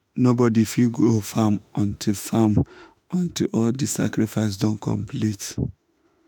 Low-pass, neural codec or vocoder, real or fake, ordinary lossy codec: none; autoencoder, 48 kHz, 32 numbers a frame, DAC-VAE, trained on Japanese speech; fake; none